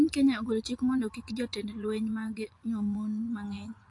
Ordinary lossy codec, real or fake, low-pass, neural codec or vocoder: AAC, 48 kbps; fake; 10.8 kHz; vocoder, 24 kHz, 100 mel bands, Vocos